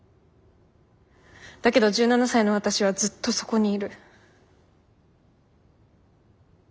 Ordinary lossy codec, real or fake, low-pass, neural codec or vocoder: none; real; none; none